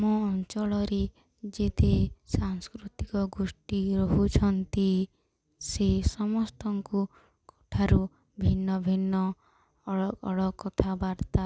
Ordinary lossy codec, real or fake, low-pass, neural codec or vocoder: none; real; none; none